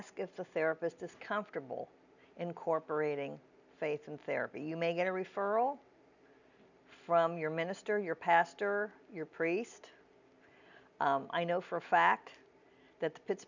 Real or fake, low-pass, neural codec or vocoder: real; 7.2 kHz; none